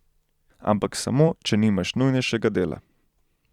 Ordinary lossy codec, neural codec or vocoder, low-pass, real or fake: Opus, 64 kbps; vocoder, 44.1 kHz, 128 mel bands every 256 samples, BigVGAN v2; 19.8 kHz; fake